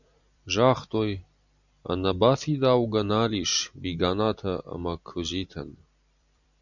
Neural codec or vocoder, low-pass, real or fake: vocoder, 44.1 kHz, 128 mel bands every 256 samples, BigVGAN v2; 7.2 kHz; fake